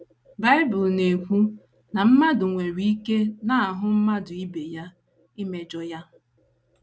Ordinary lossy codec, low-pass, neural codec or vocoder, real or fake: none; none; none; real